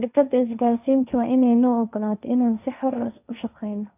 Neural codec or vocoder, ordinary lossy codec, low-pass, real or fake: codec, 16 kHz, 1.1 kbps, Voila-Tokenizer; none; 3.6 kHz; fake